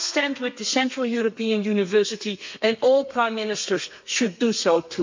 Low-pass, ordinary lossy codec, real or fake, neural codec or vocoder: 7.2 kHz; MP3, 64 kbps; fake; codec, 32 kHz, 1.9 kbps, SNAC